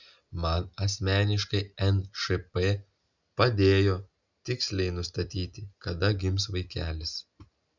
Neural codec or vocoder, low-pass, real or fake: none; 7.2 kHz; real